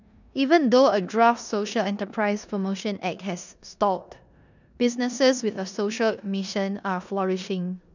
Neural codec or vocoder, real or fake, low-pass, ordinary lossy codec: codec, 16 kHz in and 24 kHz out, 0.9 kbps, LongCat-Audio-Codec, four codebook decoder; fake; 7.2 kHz; none